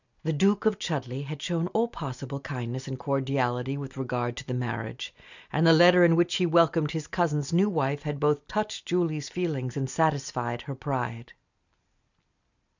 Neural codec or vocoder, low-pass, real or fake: none; 7.2 kHz; real